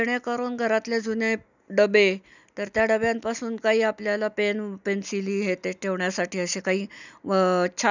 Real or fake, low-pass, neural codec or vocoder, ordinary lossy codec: real; 7.2 kHz; none; none